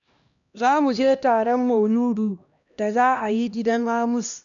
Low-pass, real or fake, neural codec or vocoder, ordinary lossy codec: 7.2 kHz; fake; codec, 16 kHz, 1 kbps, X-Codec, HuBERT features, trained on LibriSpeech; none